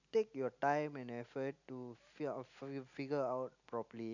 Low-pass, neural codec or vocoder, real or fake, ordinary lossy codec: 7.2 kHz; none; real; none